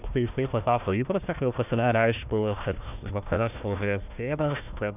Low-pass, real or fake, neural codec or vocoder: 3.6 kHz; fake; codec, 16 kHz, 1 kbps, FunCodec, trained on Chinese and English, 50 frames a second